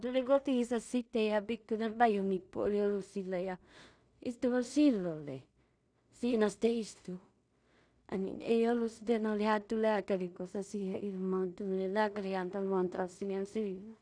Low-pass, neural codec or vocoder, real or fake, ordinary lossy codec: 9.9 kHz; codec, 16 kHz in and 24 kHz out, 0.4 kbps, LongCat-Audio-Codec, two codebook decoder; fake; none